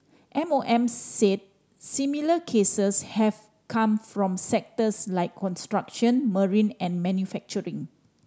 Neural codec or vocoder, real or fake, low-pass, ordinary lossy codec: none; real; none; none